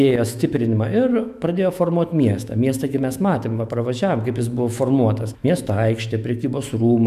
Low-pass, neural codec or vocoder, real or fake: 14.4 kHz; autoencoder, 48 kHz, 128 numbers a frame, DAC-VAE, trained on Japanese speech; fake